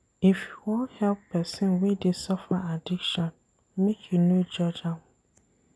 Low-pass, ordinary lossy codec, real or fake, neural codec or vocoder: none; none; real; none